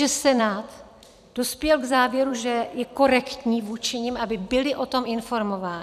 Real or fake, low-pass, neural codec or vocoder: fake; 14.4 kHz; vocoder, 44.1 kHz, 128 mel bands every 256 samples, BigVGAN v2